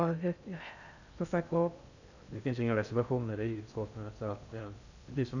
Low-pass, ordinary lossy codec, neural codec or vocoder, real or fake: 7.2 kHz; none; codec, 16 kHz in and 24 kHz out, 0.6 kbps, FocalCodec, streaming, 2048 codes; fake